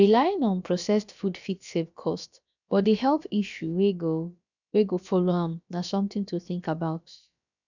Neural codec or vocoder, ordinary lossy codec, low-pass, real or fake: codec, 16 kHz, about 1 kbps, DyCAST, with the encoder's durations; none; 7.2 kHz; fake